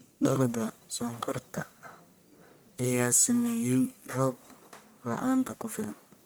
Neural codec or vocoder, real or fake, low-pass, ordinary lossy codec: codec, 44.1 kHz, 1.7 kbps, Pupu-Codec; fake; none; none